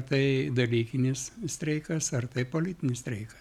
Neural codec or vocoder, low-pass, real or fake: none; 19.8 kHz; real